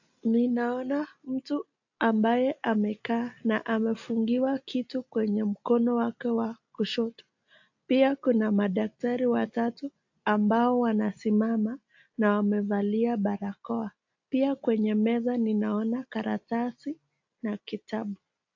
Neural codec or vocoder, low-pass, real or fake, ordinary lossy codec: none; 7.2 kHz; real; AAC, 48 kbps